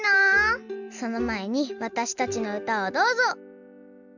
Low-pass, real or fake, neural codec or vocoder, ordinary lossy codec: 7.2 kHz; real; none; none